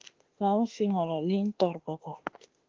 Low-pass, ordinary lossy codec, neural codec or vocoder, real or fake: 7.2 kHz; Opus, 16 kbps; autoencoder, 48 kHz, 32 numbers a frame, DAC-VAE, trained on Japanese speech; fake